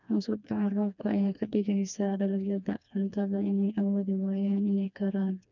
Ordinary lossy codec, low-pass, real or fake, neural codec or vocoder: none; 7.2 kHz; fake; codec, 16 kHz, 2 kbps, FreqCodec, smaller model